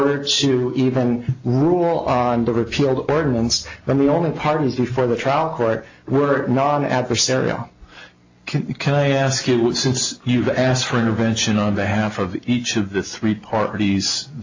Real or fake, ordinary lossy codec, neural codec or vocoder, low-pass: real; AAC, 48 kbps; none; 7.2 kHz